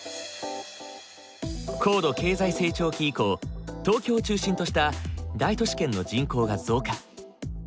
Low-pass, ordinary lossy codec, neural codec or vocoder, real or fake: none; none; none; real